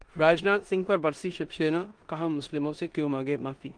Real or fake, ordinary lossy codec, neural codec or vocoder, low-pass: fake; Opus, 24 kbps; codec, 16 kHz in and 24 kHz out, 0.9 kbps, LongCat-Audio-Codec, four codebook decoder; 9.9 kHz